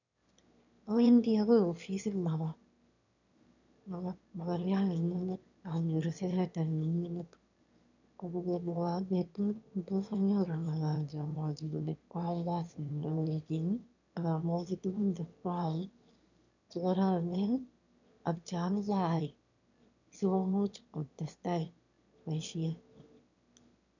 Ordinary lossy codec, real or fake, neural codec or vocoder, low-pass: none; fake; autoencoder, 22.05 kHz, a latent of 192 numbers a frame, VITS, trained on one speaker; 7.2 kHz